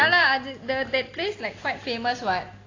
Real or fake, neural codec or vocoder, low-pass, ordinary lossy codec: real; none; 7.2 kHz; AAC, 32 kbps